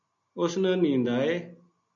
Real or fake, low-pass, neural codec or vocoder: real; 7.2 kHz; none